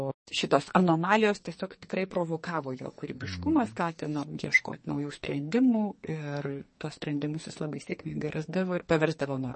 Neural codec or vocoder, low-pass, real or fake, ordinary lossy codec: codec, 44.1 kHz, 2.6 kbps, SNAC; 10.8 kHz; fake; MP3, 32 kbps